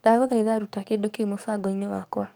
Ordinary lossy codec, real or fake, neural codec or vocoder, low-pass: none; fake; codec, 44.1 kHz, 7.8 kbps, DAC; none